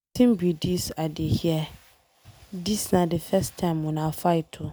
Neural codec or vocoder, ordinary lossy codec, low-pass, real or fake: none; none; none; real